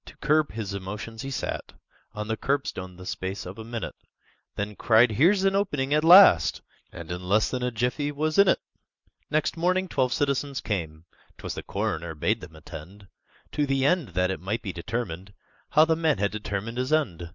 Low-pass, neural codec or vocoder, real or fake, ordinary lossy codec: 7.2 kHz; none; real; Opus, 64 kbps